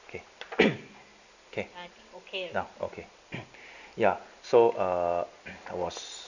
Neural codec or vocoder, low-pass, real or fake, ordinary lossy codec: none; 7.2 kHz; real; none